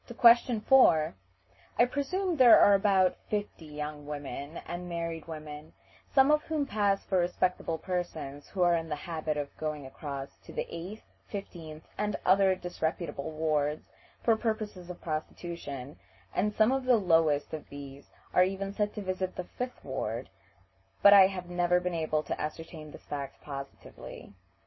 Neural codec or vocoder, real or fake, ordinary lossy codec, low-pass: none; real; MP3, 24 kbps; 7.2 kHz